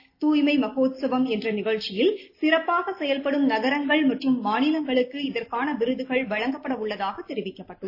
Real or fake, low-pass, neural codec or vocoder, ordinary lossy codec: real; 5.4 kHz; none; AAC, 32 kbps